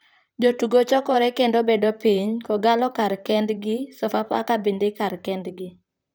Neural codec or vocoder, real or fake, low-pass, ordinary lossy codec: vocoder, 44.1 kHz, 128 mel bands, Pupu-Vocoder; fake; none; none